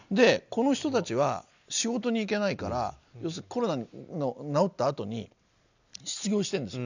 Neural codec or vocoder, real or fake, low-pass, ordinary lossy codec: none; real; 7.2 kHz; none